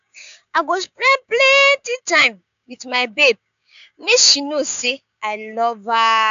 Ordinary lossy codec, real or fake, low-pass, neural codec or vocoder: AAC, 96 kbps; fake; 7.2 kHz; codec, 16 kHz, 6 kbps, DAC